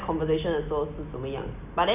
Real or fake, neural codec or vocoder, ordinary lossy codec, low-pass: real; none; none; 3.6 kHz